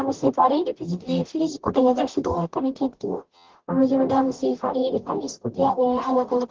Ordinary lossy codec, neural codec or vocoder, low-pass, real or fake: Opus, 16 kbps; codec, 44.1 kHz, 0.9 kbps, DAC; 7.2 kHz; fake